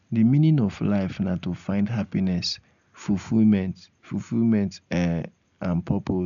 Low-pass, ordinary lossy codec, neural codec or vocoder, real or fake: 7.2 kHz; none; none; real